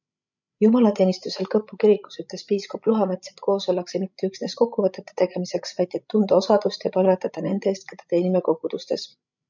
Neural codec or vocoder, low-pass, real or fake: codec, 16 kHz, 16 kbps, FreqCodec, larger model; 7.2 kHz; fake